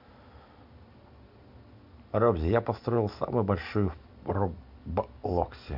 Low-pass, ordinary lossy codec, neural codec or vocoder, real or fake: 5.4 kHz; none; none; real